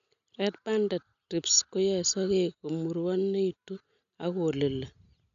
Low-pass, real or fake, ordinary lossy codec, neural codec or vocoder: 7.2 kHz; real; none; none